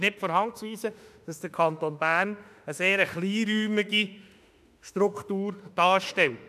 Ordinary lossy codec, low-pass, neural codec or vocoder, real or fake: none; 14.4 kHz; autoencoder, 48 kHz, 32 numbers a frame, DAC-VAE, trained on Japanese speech; fake